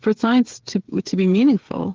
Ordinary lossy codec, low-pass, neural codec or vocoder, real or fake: Opus, 16 kbps; 7.2 kHz; codec, 16 kHz, 8 kbps, FreqCodec, smaller model; fake